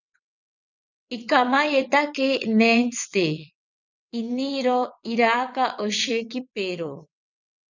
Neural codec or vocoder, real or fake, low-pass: vocoder, 22.05 kHz, 80 mel bands, WaveNeXt; fake; 7.2 kHz